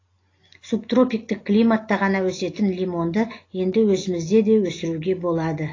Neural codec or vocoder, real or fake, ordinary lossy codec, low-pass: none; real; AAC, 32 kbps; 7.2 kHz